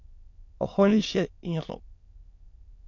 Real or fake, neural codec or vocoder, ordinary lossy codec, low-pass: fake; autoencoder, 22.05 kHz, a latent of 192 numbers a frame, VITS, trained on many speakers; MP3, 48 kbps; 7.2 kHz